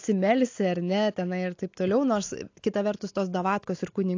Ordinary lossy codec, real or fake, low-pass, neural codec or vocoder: AAC, 48 kbps; real; 7.2 kHz; none